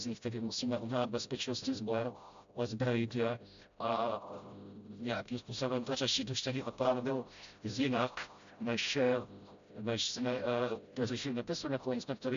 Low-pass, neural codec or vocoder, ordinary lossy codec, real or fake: 7.2 kHz; codec, 16 kHz, 0.5 kbps, FreqCodec, smaller model; MP3, 64 kbps; fake